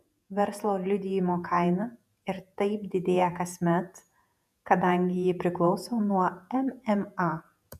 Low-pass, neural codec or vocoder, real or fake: 14.4 kHz; vocoder, 48 kHz, 128 mel bands, Vocos; fake